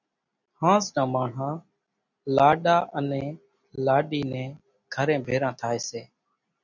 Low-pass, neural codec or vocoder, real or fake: 7.2 kHz; none; real